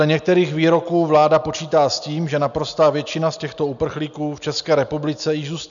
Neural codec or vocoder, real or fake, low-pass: none; real; 7.2 kHz